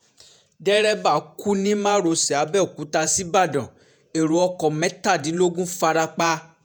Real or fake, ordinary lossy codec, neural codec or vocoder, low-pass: fake; none; vocoder, 48 kHz, 128 mel bands, Vocos; none